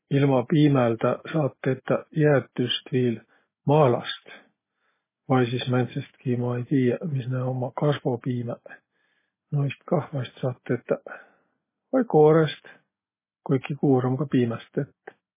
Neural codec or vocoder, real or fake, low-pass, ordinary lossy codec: none; real; 3.6 kHz; MP3, 16 kbps